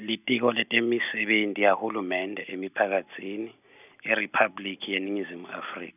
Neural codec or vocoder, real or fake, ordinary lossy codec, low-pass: none; real; none; 3.6 kHz